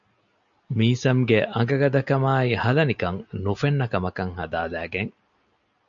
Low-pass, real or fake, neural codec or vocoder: 7.2 kHz; real; none